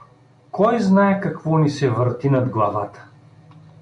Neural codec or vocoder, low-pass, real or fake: none; 10.8 kHz; real